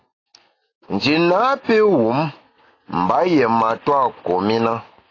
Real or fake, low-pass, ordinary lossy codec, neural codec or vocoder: real; 7.2 kHz; AAC, 32 kbps; none